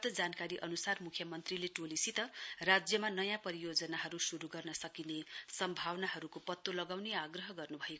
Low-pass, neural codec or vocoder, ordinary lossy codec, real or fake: none; none; none; real